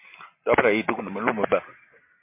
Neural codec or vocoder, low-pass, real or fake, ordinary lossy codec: none; 3.6 kHz; real; MP3, 24 kbps